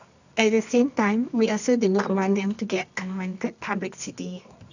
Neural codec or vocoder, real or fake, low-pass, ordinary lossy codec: codec, 24 kHz, 0.9 kbps, WavTokenizer, medium music audio release; fake; 7.2 kHz; none